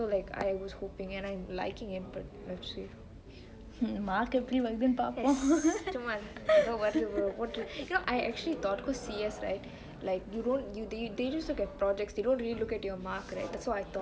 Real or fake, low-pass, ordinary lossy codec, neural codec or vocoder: real; none; none; none